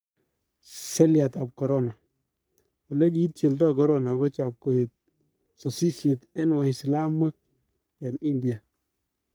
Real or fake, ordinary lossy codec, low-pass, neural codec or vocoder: fake; none; none; codec, 44.1 kHz, 3.4 kbps, Pupu-Codec